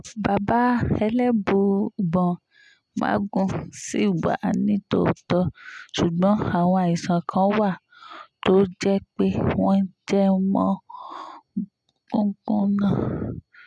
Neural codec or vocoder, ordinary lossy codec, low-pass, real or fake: none; none; none; real